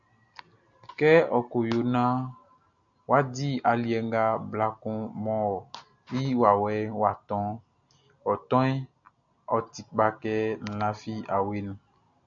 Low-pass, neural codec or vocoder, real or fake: 7.2 kHz; none; real